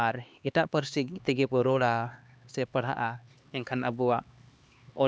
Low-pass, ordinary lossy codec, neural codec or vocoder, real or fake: none; none; codec, 16 kHz, 2 kbps, X-Codec, HuBERT features, trained on LibriSpeech; fake